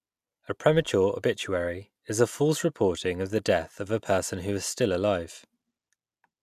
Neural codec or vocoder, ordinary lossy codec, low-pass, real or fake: none; none; 14.4 kHz; real